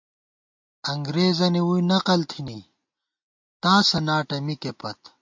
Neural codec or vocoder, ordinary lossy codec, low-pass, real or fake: none; MP3, 48 kbps; 7.2 kHz; real